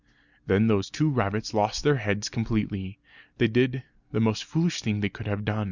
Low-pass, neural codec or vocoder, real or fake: 7.2 kHz; none; real